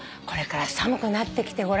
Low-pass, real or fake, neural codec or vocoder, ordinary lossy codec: none; real; none; none